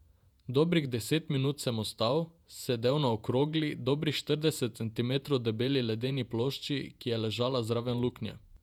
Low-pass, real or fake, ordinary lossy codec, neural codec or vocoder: 19.8 kHz; fake; none; vocoder, 48 kHz, 128 mel bands, Vocos